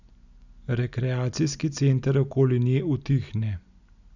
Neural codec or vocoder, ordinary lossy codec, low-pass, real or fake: none; none; 7.2 kHz; real